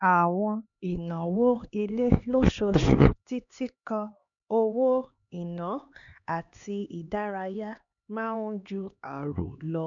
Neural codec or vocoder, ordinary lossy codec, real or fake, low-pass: codec, 16 kHz, 2 kbps, X-Codec, HuBERT features, trained on LibriSpeech; none; fake; 7.2 kHz